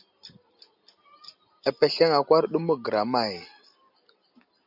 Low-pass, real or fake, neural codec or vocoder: 5.4 kHz; real; none